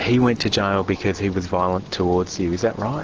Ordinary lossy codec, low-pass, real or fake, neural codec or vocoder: Opus, 16 kbps; 7.2 kHz; real; none